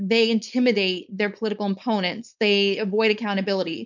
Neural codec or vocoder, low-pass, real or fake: codec, 16 kHz, 4.8 kbps, FACodec; 7.2 kHz; fake